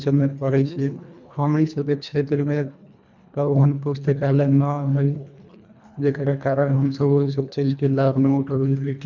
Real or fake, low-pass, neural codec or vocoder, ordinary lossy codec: fake; 7.2 kHz; codec, 24 kHz, 1.5 kbps, HILCodec; none